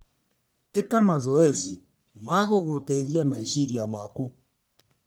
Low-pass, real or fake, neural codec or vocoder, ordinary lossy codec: none; fake; codec, 44.1 kHz, 1.7 kbps, Pupu-Codec; none